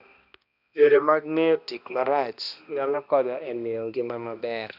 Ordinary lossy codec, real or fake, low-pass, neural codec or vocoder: none; fake; 5.4 kHz; codec, 16 kHz, 1 kbps, X-Codec, HuBERT features, trained on balanced general audio